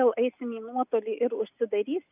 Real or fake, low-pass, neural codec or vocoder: real; 3.6 kHz; none